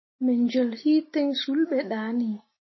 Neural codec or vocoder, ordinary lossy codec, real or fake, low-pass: none; MP3, 24 kbps; real; 7.2 kHz